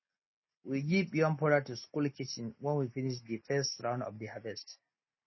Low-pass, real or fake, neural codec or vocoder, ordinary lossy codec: 7.2 kHz; real; none; MP3, 24 kbps